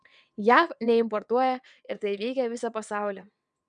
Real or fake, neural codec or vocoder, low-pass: fake; vocoder, 22.05 kHz, 80 mel bands, WaveNeXt; 9.9 kHz